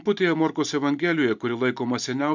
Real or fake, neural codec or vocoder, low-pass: real; none; 7.2 kHz